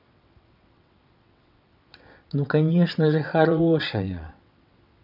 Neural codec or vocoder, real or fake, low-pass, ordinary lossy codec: vocoder, 22.05 kHz, 80 mel bands, WaveNeXt; fake; 5.4 kHz; none